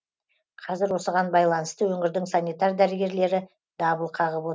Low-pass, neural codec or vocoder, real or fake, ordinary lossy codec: none; none; real; none